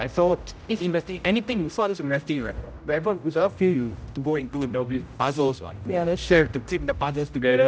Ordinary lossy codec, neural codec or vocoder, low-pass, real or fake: none; codec, 16 kHz, 0.5 kbps, X-Codec, HuBERT features, trained on general audio; none; fake